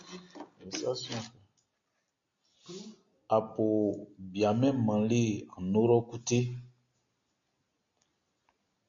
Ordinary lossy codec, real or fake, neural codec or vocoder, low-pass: MP3, 48 kbps; real; none; 7.2 kHz